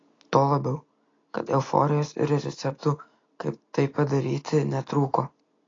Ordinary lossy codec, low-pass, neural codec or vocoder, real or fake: AAC, 32 kbps; 7.2 kHz; none; real